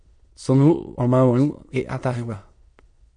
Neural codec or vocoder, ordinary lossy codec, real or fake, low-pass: autoencoder, 22.05 kHz, a latent of 192 numbers a frame, VITS, trained on many speakers; MP3, 48 kbps; fake; 9.9 kHz